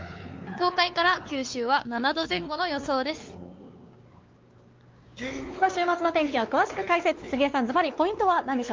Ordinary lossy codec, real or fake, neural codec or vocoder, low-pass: Opus, 24 kbps; fake; codec, 16 kHz, 4 kbps, X-Codec, WavLM features, trained on Multilingual LibriSpeech; 7.2 kHz